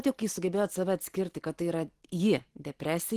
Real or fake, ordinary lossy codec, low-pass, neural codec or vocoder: real; Opus, 16 kbps; 14.4 kHz; none